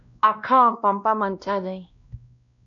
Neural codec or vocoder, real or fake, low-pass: codec, 16 kHz, 1 kbps, X-Codec, WavLM features, trained on Multilingual LibriSpeech; fake; 7.2 kHz